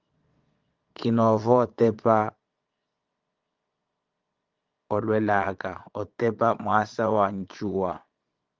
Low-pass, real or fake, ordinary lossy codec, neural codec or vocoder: 7.2 kHz; fake; Opus, 24 kbps; vocoder, 22.05 kHz, 80 mel bands, Vocos